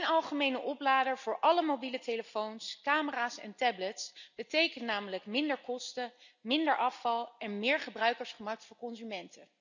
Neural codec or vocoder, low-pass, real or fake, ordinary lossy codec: none; 7.2 kHz; real; none